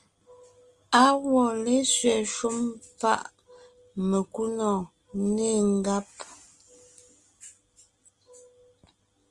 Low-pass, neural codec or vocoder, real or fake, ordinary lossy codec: 10.8 kHz; none; real; Opus, 24 kbps